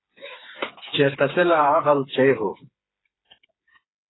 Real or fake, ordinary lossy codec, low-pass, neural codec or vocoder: fake; AAC, 16 kbps; 7.2 kHz; codec, 16 kHz, 4 kbps, FreqCodec, smaller model